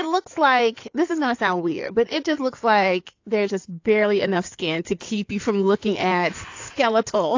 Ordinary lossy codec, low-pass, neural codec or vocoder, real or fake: AAC, 48 kbps; 7.2 kHz; codec, 16 kHz in and 24 kHz out, 2.2 kbps, FireRedTTS-2 codec; fake